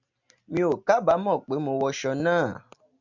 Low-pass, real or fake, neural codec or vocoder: 7.2 kHz; real; none